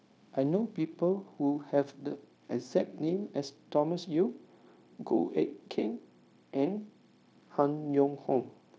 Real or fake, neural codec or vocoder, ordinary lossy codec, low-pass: fake; codec, 16 kHz, 0.9 kbps, LongCat-Audio-Codec; none; none